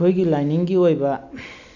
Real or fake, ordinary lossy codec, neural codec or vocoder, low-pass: real; none; none; 7.2 kHz